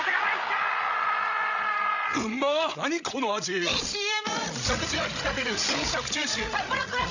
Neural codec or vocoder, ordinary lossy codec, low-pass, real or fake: codec, 16 kHz, 16 kbps, FreqCodec, larger model; none; 7.2 kHz; fake